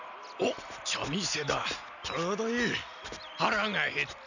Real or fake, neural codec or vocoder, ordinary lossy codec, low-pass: real; none; none; 7.2 kHz